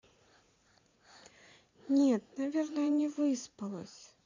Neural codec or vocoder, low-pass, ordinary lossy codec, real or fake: vocoder, 44.1 kHz, 80 mel bands, Vocos; 7.2 kHz; MP3, 64 kbps; fake